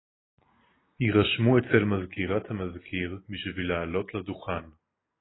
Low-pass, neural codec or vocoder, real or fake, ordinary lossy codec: 7.2 kHz; none; real; AAC, 16 kbps